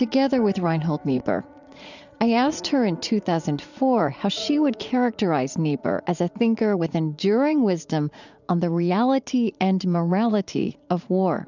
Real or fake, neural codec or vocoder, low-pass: fake; vocoder, 44.1 kHz, 80 mel bands, Vocos; 7.2 kHz